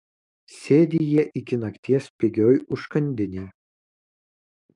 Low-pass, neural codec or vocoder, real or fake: 10.8 kHz; codec, 44.1 kHz, 7.8 kbps, DAC; fake